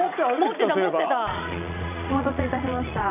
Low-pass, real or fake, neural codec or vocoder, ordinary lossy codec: 3.6 kHz; fake; vocoder, 22.05 kHz, 80 mel bands, Vocos; none